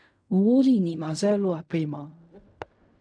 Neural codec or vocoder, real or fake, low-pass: codec, 16 kHz in and 24 kHz out, 0.4 kbps, LongCat-Audio-Codec, fine tuned four codebook decoder; fake; 9.9 kHz